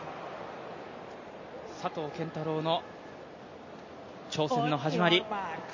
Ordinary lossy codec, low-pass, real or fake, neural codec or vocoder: MP3, 32 kbps; 7.2 kHz; real; none